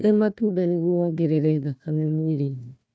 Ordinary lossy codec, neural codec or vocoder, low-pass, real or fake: none; codec, 16 kHz, 1 kbps, FunCodec, trained on Chinese and English, 50 frames a second; none; fake